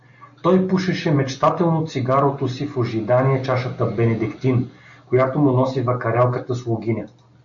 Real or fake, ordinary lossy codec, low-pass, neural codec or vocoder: real; AAC, 64 kbps; 7.2 kHz; none